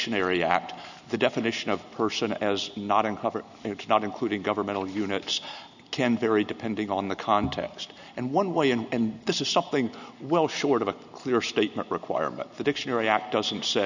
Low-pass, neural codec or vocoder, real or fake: 7.2 kHz; none; real